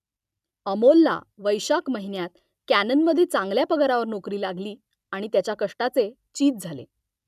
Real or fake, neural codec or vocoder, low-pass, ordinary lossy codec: real; none; 14.4 kHz; none